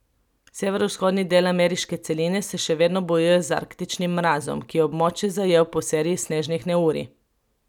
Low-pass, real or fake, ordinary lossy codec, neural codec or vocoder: 19.8 kHz; real; none; none